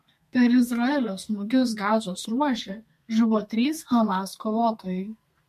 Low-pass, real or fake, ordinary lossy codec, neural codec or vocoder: 14.4 kHz; fake; MP3, 64 kbps; codec, 32 kHz, 1.9 kbps, SNAC